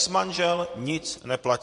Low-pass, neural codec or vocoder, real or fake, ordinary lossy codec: 10.8 kHz; vocoder, 24 kHz, 100 mel bands, Vocos; fake; MP3, 48 kbps